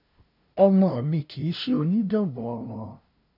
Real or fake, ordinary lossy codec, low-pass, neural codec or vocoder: fake; none; 5.4 kHz; codec, 16 kHz, 0.5 kbps, FunCodec, trained on LibriTTS, 25 frames a second